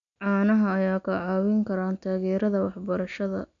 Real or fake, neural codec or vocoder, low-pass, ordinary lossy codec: real; none; 7.2 kHz; none